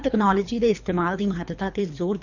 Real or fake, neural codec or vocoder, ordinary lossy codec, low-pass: fake; codec, 24 kHz, 3 kbps, HILCodec; none; 7.2 kHz